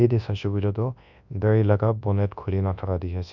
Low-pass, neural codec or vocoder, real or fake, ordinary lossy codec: 7.2 kHz; codec, 24 kHz, 0.9 kbps, WavTokenizer, large speech release; fake; none